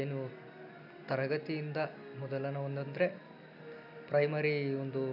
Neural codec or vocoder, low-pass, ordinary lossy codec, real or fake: none; 5.4 kHz; none; real